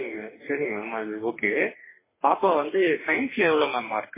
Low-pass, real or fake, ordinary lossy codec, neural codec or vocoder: 3.6 kHz; fake; MP3, 16 kbps; codec, 44.1 kHz, 2.6 kbps, DAC